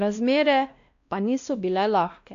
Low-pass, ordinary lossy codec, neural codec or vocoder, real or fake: 7.2 kHz; AAC, 64 kbps; codec, 16 kHz, 1 kbps, X-Codec, WavLM features, trained on Multilingual LibriSpeech; fake